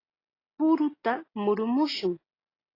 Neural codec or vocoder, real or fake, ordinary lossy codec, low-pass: none; real; AAC, 24 kbps; 5.4 kHz